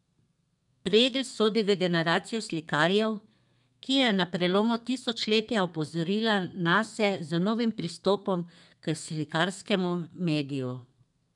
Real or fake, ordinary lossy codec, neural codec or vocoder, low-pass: fake; none; codec, 32 kHz, 1.9 kbps, SNAC; 10.8 kHz